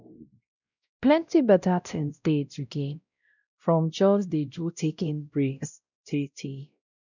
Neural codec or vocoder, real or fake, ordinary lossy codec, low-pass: codec, 16 kHz, 0.5 kbps, X-Codec, WavLM features, trained on Multilingual LibriSpeech; fake; none; 7.2 kHz